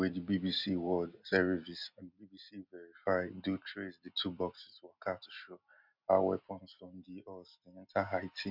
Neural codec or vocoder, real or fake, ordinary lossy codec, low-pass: none; real; MP3, 48 kbps; 5.4 kHz